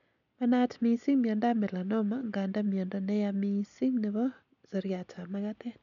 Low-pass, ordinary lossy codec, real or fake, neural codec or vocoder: 7.2 kHz; none; real; none